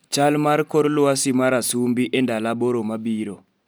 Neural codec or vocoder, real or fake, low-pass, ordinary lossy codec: none; real; none; none